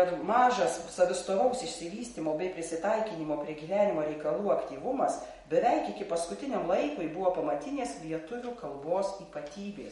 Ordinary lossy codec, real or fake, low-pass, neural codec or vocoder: MP3, 48 kbps; real; 19.8 kHz; none